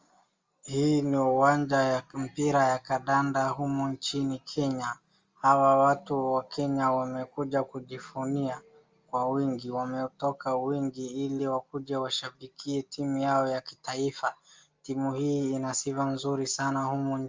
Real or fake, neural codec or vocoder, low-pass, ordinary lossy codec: real; none; 7.2 kHz; Opus, 32 kbps